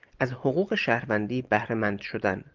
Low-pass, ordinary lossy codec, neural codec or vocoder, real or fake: 7.2 kHz; Opus, 24 kbps; none; real